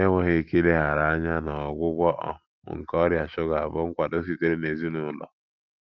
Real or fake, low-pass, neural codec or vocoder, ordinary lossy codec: real; 7.2 kHz; none; Opus, 32 kbps